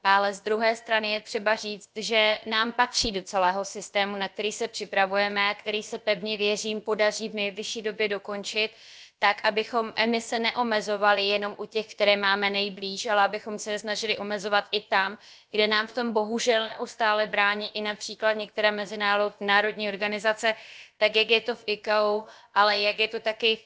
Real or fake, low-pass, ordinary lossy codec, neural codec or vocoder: fake; none; none; codec, 16 kHz, about 1 kbps, DyCAST, with the encoder's durations